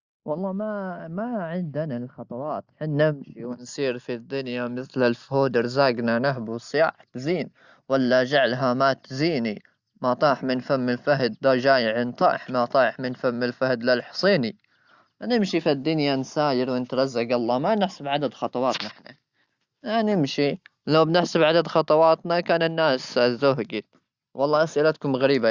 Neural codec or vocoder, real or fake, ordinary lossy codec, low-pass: none; real; Opus, 64 kbps; 7.2 kHz